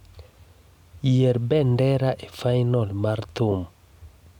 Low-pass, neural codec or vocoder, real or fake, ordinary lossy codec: 19.8 kHz; none; real; none